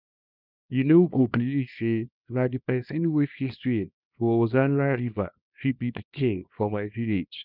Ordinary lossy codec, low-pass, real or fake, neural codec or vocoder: none; 5.4 kHz; fake; codec, 24 kHz, 0.9 kbps, WavTokenizer, small release